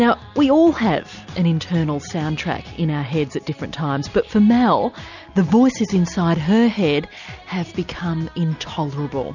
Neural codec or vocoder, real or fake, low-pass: none; real; 7.2 kHz